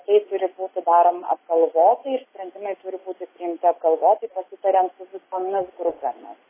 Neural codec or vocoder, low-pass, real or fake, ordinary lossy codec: none; 3.6 kHz; real; MP3, 16 kbps